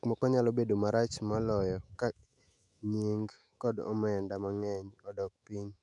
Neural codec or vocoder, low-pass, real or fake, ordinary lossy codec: autoencoder, 48 kHz, 128 numbers a frame, DAC-VAE, trained on Japanese speech; 10.8 kHz; fake; MP3, 96 kbps